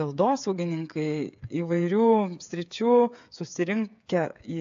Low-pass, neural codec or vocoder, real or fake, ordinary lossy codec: 7.2 kHz; codec, 16 kHz, 8 kbps, FreqCodec, smaller model; fake; AAC, 64 kbps